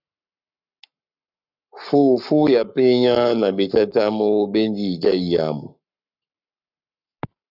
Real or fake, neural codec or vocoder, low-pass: fake; codec, 44.1 kHz, 7.8 kbps, Pupu-Codec; 5.4 kHz